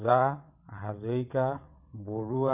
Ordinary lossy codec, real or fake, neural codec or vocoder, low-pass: none; fake; vocoder, 22.05 kHz, 80 mel bands, WaveNeXt; 3.6 kHz